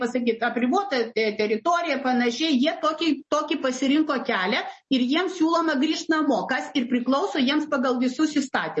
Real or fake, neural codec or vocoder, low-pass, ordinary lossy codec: real; none; 10.8 kHz; MP3, 32 kbps